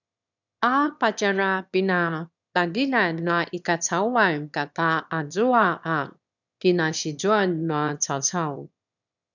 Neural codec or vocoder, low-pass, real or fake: autoencoder, 22.05 kHz, a latent of 192 numbers a frame, VITS, trained on one speaker; 7.2 kHz; fake